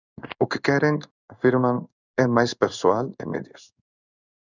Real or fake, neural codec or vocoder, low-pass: fake; codec, 16 kHz in and 24 kHz out, 1 kbps, XY-Tokenizer; 7.2 kHz